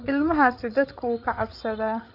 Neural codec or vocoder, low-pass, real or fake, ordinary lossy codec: codec, 16 kHz, 8 kbps, FreqCodec, larger model; 5.4 kHz; fake; AAC, 48 kbps